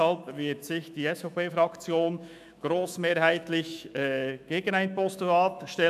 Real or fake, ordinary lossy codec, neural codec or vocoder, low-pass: fake; none; autoencoder, 48 kHz, 128 numbers a frame, DAC-VAE, trained on Japanese speech; 14.4 kHz